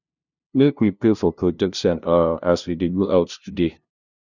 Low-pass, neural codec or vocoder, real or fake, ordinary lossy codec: 7.2 kHz; codec, 16 kHz, 0.5 kbps, FunCodec, trained on LibriTTS, 25 frames a second; fake; none